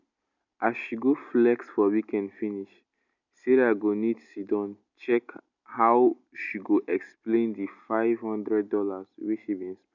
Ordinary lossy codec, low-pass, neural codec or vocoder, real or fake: none; 7.2 kHz; none; real